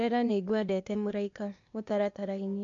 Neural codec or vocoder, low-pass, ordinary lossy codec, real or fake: codec, 16 kHz, 0.8 kbps, ZipCodec; 7.2 kHz; MP3, 64 kbps; fake